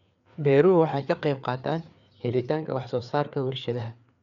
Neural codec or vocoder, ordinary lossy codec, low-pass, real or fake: codec, 16 kHz, 4 kbps, FreqCodec, larger model; none; 7.2 kHz; fake